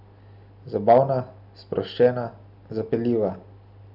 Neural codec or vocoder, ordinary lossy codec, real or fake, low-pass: none; none; real; 5.4 kHz